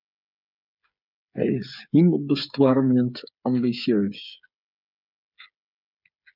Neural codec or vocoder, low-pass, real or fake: codec, 16 kHz, 8 kbps, FreqCodec, smaller model; 5.4 kHz; fake